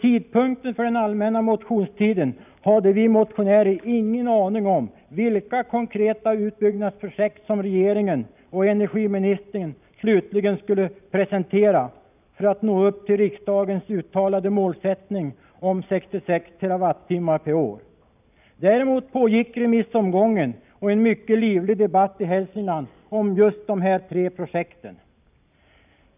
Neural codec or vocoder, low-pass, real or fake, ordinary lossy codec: none; 3.6 kHz; real; none